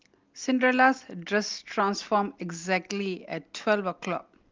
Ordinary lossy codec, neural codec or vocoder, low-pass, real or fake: Opus, 32 kbps; none; 7.2 kHz; real